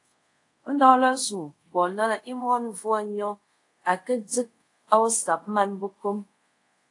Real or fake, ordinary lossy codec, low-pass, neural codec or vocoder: fake; AAC, 48 kbps; 10.8 kHz; codec, 24 kHz, 0.5 kbps, DualCodec